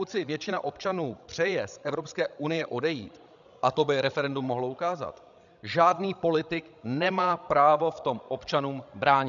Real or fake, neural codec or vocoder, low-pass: fake; codec, 16 kHz, 16 kbps, FreqCodec, larger model; 7.2 kHz